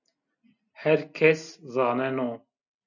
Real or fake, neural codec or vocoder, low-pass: real; none; 7.2 kHz